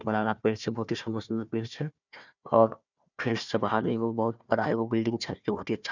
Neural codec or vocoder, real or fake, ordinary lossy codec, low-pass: codec, 16 kHz, 1 kbps, FunCodec, trained on Chinese and English, 50 frames a second; fake; none; 7.2 kHz